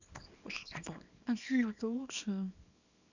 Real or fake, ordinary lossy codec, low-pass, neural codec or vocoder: fake; none; 7.2 kHz; codec, 24 kHz, 0.9 kbps, WavTokenizer, small release